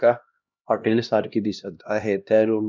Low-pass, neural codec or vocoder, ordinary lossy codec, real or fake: 7.2 kHz; codec, 16 kHz, 1 kbps, X-Codec, HuBERT features, trained on LibriSpeech; none; fake